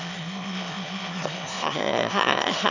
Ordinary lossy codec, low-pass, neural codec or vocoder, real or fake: none; 7.2 kHz; autoencoder, 22.05 kHz, a latent of 192 numbers a frame, VITS, trained on one speaker; fake